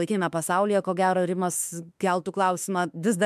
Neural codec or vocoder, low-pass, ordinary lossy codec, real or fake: autoencoder, 48 kHz, 32 numbers a frame, DAC-VAE, trained on Japanese speech; 14.4 kHz; MP3, 96 kbps; fake